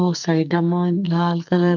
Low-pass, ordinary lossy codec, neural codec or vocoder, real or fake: 7.2 kHz; none; codec, 44.1 kHz, 2.6 kbps, SNAC; fake